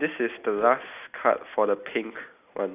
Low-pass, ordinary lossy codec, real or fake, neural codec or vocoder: 3.6 kHz; none; real; none